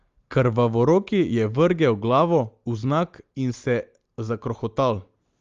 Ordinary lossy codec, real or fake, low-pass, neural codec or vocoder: Opus, 32 kbps; real; 7.2 kHz; none